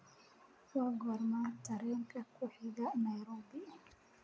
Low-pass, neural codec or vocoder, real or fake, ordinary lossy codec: none; none; real; none